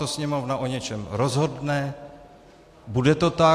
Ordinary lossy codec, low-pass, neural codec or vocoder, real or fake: AAC, 64 kbps; 14.4 kHz; none; real